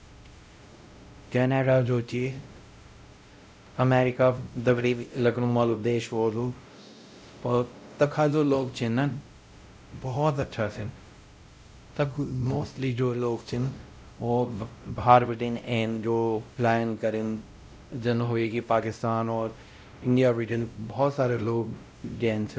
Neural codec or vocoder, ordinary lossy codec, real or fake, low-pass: codec, 16 kHz, 0.5 kbps, X-Codec, WavLM features, trained on Multilingual LibriSpeech; none; fake; none